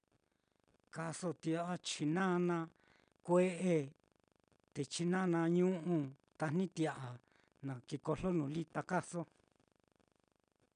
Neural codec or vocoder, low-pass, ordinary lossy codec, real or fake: none; 9.9 kHz; none; real